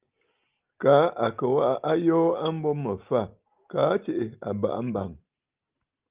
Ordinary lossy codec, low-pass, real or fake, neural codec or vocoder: Opus, 32 kbps; 3.6 kHz; real; none